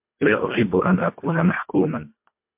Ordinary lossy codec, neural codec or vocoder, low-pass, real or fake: MP3, 32 kbps; codec, 24 kHz, 1.5 kbps, HILCodec; 3.6 kHz; fake